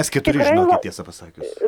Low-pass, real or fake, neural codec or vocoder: 19.8 kHz; real; none